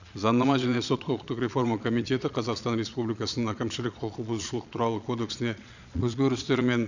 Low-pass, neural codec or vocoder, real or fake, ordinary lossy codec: 7.2 kHz; vocoder, 22.05 kHz, 80 mel bands, Vocos; fake; none